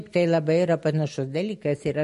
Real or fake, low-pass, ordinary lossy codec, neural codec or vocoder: real; 14.4 kHz; MP3, 48 kbps; none